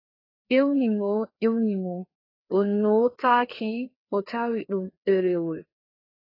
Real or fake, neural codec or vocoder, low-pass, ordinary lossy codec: fake; codec, 16 kHz, 2 kbps, FreqCodec, larger model; 5.4 kHz; AAC, 24 kbps